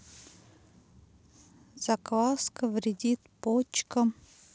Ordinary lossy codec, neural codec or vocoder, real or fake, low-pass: none; none; real; none